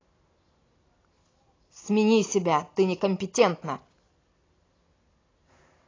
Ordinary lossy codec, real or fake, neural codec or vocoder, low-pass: AAC, 32 kbps; real; none; 7.2 kHz